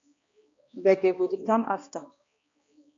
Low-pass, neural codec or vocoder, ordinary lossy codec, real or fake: 7.2 kHz; codec, 16 kHz, 1 kbps, X-Codec, HuBERT features, trained on balanced general audio; MP3, 48 kbps; fake